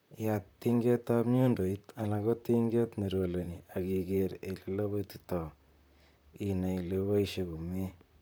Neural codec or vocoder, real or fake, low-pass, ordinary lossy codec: vocoder, 44.1 kHz, 128 mel bands, Pupu-Vocoder; fake; none; none